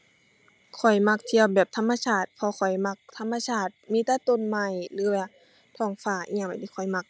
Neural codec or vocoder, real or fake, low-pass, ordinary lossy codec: none; real; none; none